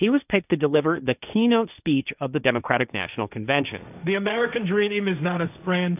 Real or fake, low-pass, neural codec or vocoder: fake; 3.6 kHz; codec, 16 kHz, 1.1 kbps, Voila-Tokenizer